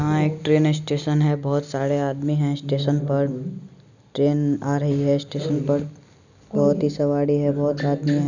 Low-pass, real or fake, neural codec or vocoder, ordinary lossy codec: 7.2 kHz; real; none; none